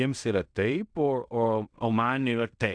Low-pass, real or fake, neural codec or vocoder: 9.9 kHz; fake; codec, 16 kHz in and 24 kHz out, 0.4 kbps, LongCat-Audio-Codec, fine tuned four codebook decoder